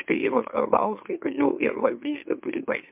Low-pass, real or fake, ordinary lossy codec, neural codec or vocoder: 3.6 kHz; fake; MP3, 32 kbps; autoencoder, 44.1 kHz, a latent of 192 numbers a frame, MeloTTS